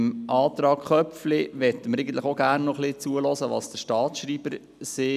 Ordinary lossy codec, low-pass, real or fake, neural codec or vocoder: none; 14.4 kHz; real; none